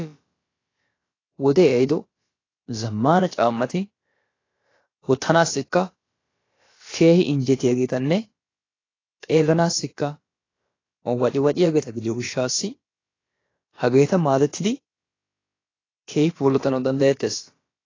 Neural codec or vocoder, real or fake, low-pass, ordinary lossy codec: codec, 16 kHz, about 1 kbps, DyCAST, with the encoder's durations; fake; 7.2 kHz; AAC, 32 kbps